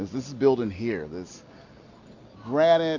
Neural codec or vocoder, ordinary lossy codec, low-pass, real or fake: none; MP3, 48 kbps; 7.2 kHz; real